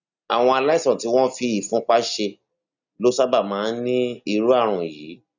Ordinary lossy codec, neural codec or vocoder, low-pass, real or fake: none; none; 7.2 kHz; real